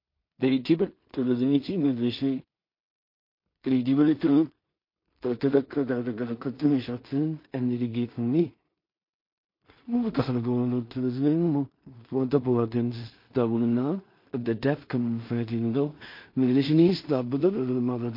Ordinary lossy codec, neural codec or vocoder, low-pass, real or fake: MP3, 32 kbps; codec, 16 kHz in and 24 kHz out, 0.4 kbps, LongCat-Audio-Codec, two codebook decoder; 5.4 kHz; fake